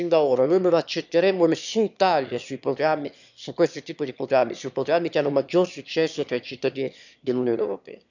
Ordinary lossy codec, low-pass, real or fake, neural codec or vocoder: none; 7.2 kHz; fake; autoencoder, 22.05 kHz, a latent of 192 numbers a frame, VITS, trained on one speaker